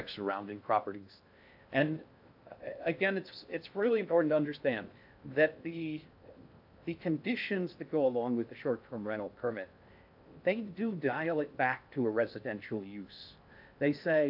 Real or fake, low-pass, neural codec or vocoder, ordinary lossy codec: fake; 5.4 kHz; codec, 16 kHz in and 24 kHz out, 0.6 kbps, FocalCodec, streaming, 2048 codes; AAC, 48 kbps